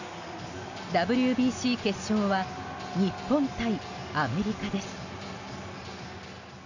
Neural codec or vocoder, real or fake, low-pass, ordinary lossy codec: none; real; 7.2 kHz; none